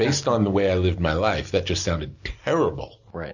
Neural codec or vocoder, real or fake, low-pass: none; real; 7.2 kHz